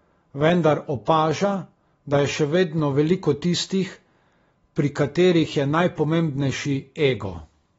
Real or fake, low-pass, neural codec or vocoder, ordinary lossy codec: real; 10.8 kHz; none; AAC, 24 kbps